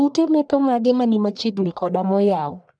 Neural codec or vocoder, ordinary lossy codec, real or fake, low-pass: codec, 44.1 kHz, 1.7 kbps, Pupu-Codec; none; fake; 9.9 kHz